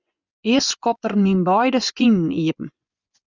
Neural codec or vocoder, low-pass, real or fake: vocoder, 24 kHz, 100 mel bands, Vocos; 7.2 kHz; fake